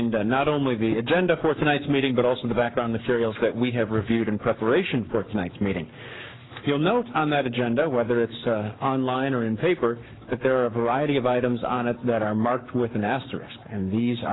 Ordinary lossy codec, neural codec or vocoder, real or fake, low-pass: AAC, 16 kbps; codec, 44.1 kHz, 7.8 kbps, Pupu-Codec; fake; 7.2 kHz